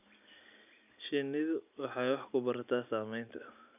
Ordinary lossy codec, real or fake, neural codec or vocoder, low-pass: none; real; none; 3.6 kHz